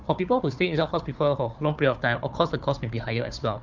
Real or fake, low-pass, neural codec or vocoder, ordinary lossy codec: fake; 7.2 kHz; codec, 16 kHz, 4 kbps, FunCodec, trained on Chinese and English, 50 frames a second; Opus, 24 kbps